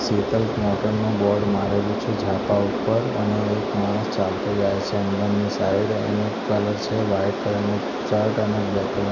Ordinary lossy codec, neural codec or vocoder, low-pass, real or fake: none; none; 7.2 kHz; real